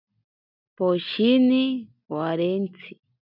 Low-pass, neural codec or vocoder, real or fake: 5.4 kHz; none; real